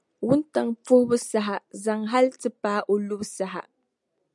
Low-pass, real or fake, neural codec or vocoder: 10.8 kHz; real; none